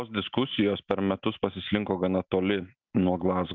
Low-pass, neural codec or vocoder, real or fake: 7.2 kHz; none; real